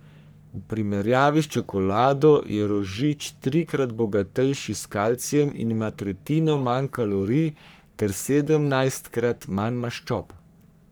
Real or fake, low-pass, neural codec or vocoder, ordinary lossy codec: fake; none; codec, 44.1 kHz, 3.4 kbps, Pupu-Codec; none